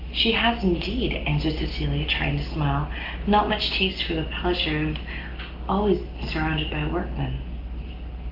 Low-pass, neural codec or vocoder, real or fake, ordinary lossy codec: 5.4 kHz; none; real; Opus, 32 kbps